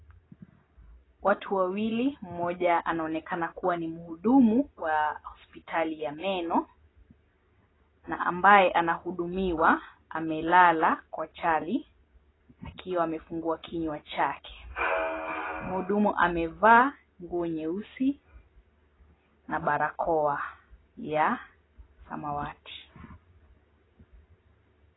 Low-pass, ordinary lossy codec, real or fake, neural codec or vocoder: 7.2 kHz; AAC, 16 kbps; real; none